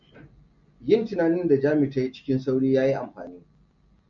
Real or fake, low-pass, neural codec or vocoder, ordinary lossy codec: real; 7.2 kHz; none; MP3, 48 kbps